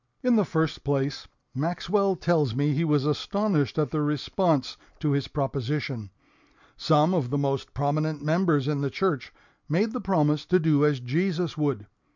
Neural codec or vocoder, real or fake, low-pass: none; real; 7.2 kHz